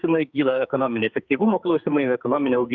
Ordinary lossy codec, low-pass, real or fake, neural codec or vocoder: Opus, 64 kbps; 7.2 kHz; fake; codec, 44.1 kHz, 2.6 kbps, SNAC